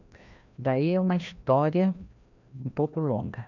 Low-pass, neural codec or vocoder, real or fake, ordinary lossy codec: 7.2 kHz; codec, 16 kHz, 1 kbps, FreqCodec, larger model; fake; none